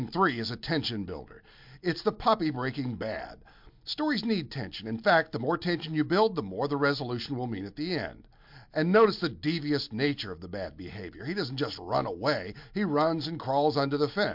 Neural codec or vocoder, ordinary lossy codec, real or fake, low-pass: none; MP3, 48 kbps; real; 5.4 kHz